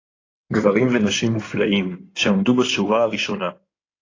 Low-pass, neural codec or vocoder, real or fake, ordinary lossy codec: 7.2 kHz; codec, 16 kHz in and 24 kHz out, 2.2 kbps, FireRedTTS-2 codec; fake; AAC, 32 kbps